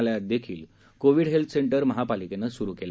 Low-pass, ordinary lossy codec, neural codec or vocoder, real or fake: none; none; none; real